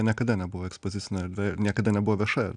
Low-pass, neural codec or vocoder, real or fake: 9.9 kHz; none; real